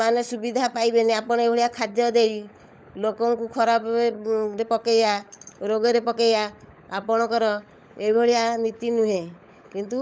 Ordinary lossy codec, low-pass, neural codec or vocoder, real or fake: none; none; codec, 16 kHz, 16 kbps, FunCodec, trained on LibriTTS, 50 frames a second; fake